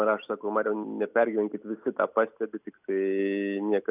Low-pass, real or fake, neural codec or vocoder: 3.6 kHz; real; none